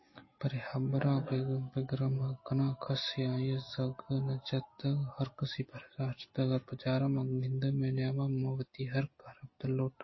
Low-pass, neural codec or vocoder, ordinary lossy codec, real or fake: 7.2 kHz; none; MP3, 24 kbps; real